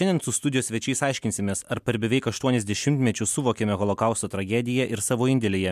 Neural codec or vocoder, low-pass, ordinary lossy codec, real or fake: none; 14.4 kHz; MP3, 96 kbps; real